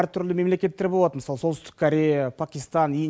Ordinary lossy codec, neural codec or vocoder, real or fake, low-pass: none; none; real; none